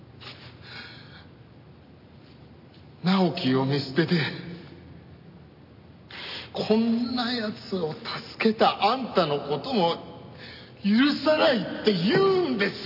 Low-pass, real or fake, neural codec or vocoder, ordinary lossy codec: 5.4 kHz; real; none; none